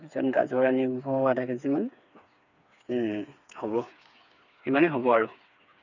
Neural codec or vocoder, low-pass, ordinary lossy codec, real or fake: codec, 16 kHz, 4 kbps, FreqCodec, smaller model; 7.2 kHz; none; fake